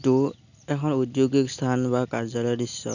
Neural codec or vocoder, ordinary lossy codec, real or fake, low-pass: none; none; real; 7.2 kHz